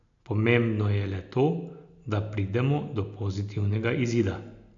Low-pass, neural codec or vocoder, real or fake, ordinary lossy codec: 7.2 kHz; none; real; none